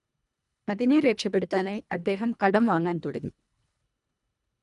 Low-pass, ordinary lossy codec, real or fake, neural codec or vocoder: 10.8 kHz; none; fake; codec, 24 kHz, 1.5 kbps, HILCodec